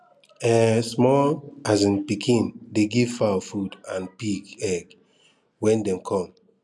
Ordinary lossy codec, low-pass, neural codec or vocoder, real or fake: none; none; none; real